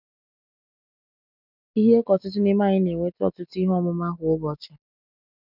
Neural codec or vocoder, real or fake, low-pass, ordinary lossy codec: none; real; 5.4 kHz; none